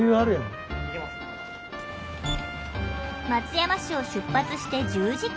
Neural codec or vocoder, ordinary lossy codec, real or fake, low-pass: none; none; real; none